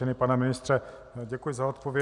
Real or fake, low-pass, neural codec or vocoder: real; 10.8 kHz; none